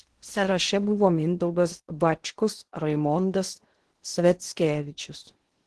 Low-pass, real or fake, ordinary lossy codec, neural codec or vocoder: 10.8 kHz; fake; Opus, 16 kbps; codec, 16 kHz in and 24 kHz out, 0.6 kbps, FocalCodec, streaming, 4096 codes